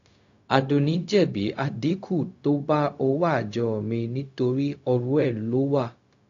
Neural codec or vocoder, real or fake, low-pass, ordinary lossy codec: codec, 16 kHz, 0.4 kbps, LongCat-Audio-Codec; fake; 7.2 kHz; AAC, 48 kbps